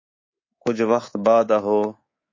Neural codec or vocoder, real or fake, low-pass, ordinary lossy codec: codec, 24 kHz, 3.1 kbps, DualCodec; fake; 7.2 kHz; MP3, 32 kbps